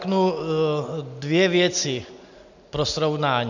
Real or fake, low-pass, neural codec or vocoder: real; 7.2 kHz; none